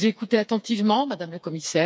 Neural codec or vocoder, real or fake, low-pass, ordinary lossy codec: codec, 16 kHz, 4 kbps, FreqCodec, smaller model; fake; none; none